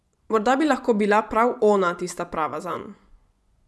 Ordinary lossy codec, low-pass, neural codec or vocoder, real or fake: none; none; vocoder, 24 kHz, 100 mel bands, Vocos; fake